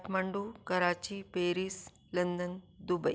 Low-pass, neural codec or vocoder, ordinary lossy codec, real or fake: none; none; none; real